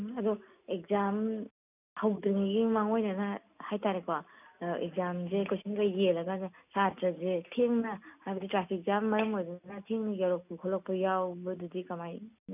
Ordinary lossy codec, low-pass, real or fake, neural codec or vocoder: none; 3.6 kHz; real; none